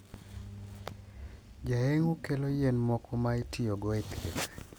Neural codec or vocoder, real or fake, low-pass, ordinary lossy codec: none; real; none; none